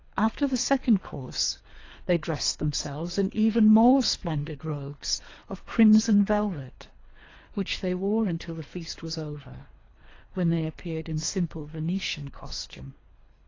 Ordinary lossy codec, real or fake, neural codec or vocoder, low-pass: AAC, 32 kbps; fake; codec, 24 kHz, 3 kbps, HILCodec; 7.2 kHz